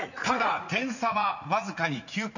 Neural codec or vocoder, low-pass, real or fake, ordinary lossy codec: vocoder, 44.1 kHz, 80 mel bands, Vocos; 7.2 kHz; fake; none